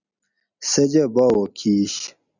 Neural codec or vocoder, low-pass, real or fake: none; 7.2 kHz; real